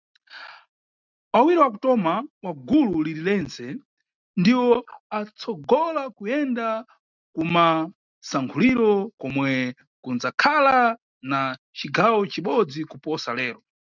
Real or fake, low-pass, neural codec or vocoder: real; 7.2 kHz; none